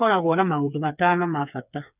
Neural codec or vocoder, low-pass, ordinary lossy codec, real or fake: codec, 16 kHz, 4 kbps, FreqCodec, smaller model; 3.6 kHz; none; fake